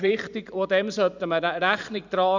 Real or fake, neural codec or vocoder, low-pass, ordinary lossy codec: fake; vocoder, 44.1 kHz, 128 mel bands every 512 samples, BigVGAN v2; 7.2 kHz; none